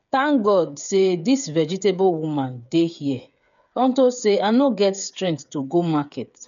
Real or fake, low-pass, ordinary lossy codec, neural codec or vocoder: fake; 7.2 kHz; none; codec, 16 kHz, 8 kbps, FreqCodec, smaller model